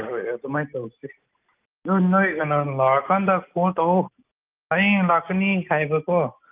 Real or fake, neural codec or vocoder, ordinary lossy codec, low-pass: real; none; Opus, 24 kbps; 3.6 kHz